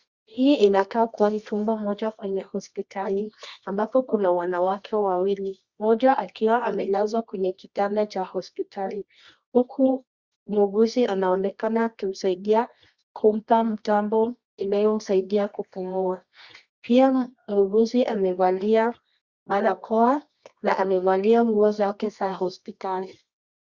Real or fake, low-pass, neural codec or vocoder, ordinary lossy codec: fake; 7.2 kHz; codec, 24 kHz, 0.9 kbps, WavTokenizer, medium music audio release; Opus, 64 kbps